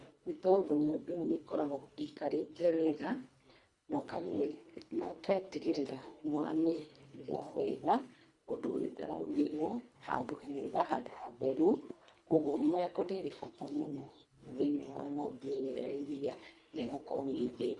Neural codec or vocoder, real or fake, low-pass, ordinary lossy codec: codec, 24 kHz, 1.5 kbps, HILCodec; fake; 10.8 kHz; Opus, 64 kbps